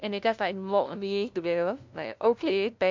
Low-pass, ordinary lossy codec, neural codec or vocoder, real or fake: 7.2 kHz; MP3, 64 kbps; codec, 16 kHz, 0.5 kbps, FunCodec, trained on LibriTTS, 25 frames a second; fake